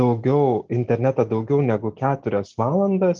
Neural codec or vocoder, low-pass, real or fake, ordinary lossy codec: none; 7.2 kHz; real; Opus, 24 kbps